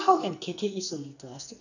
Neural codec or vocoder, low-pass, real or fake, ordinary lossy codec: codec, 44.1 kHz, 2.6 kbps, SNAC; 7.2 kHz; fake; none